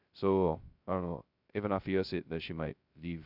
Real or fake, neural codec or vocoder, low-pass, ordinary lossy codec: fake; codec, 16 kHz, 0.2 kbps, FocalCodec; 5.4 kHz; none